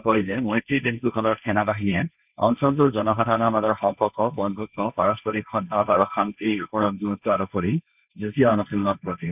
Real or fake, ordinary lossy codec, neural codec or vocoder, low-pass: fake; none; codec, 16 kHz, 1.1 kbps, Voila-Tokenizer; 3.6 kHz